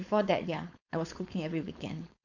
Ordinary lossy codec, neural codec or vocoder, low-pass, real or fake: none; codec, 16 kHz, 4.8 kbps, FACodec; 7.2 kHz; fake